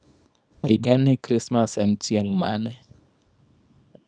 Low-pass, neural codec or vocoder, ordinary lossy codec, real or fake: 9.9 kHz; codec, 24 kHz, 0.9 kbps, WavTokenizer, small release; none; fake